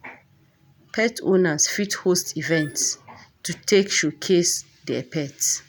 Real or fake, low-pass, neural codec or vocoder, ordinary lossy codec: real; none; none; none